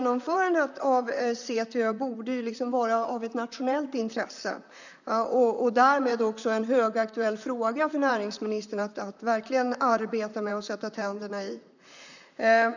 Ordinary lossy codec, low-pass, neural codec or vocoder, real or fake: none; 7.2 kHz; vocoder, 44.1 kHz, 128 mel bands, Pupu-Vocoder; fake